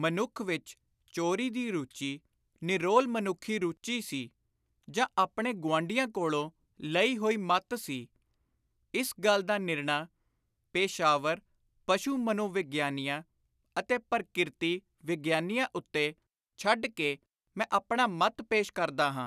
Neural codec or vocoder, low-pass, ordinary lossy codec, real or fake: none; 14.4 kHz; none; real